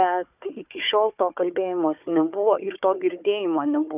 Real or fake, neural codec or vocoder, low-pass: fake; codec, 16 kHz, 4 kbps, FunCodec, trained on Chinese and English, 50 frames a second; 3.6 kHz